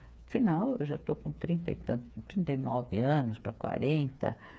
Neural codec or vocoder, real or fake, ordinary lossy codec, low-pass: codec, 16 kHz, 4 kbps, FreqCodec, smaller model; fake; none; none